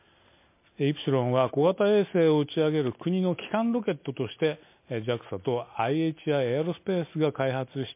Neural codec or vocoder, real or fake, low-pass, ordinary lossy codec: none; real; 3.6 kHz; MP3, 24 kbps